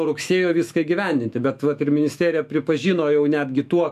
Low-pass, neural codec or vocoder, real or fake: 14.4 kHz; autoencoder, 48 kHz, 128 numbers a frame, DAC-VAE, trained on Japanese speech; fake